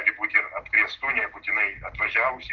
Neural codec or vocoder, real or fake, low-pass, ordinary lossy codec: none; real; 7.2 kHz; Opus, 16 kbps